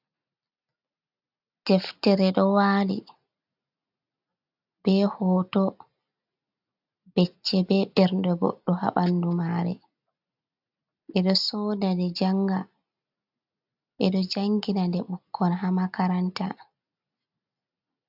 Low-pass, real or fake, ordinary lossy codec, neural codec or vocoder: 5.4 kHz; real; AAC, 48 kbps; none